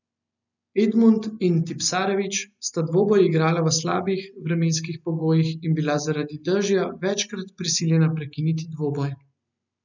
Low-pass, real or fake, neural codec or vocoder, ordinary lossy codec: 7.2 kHz; real; none; none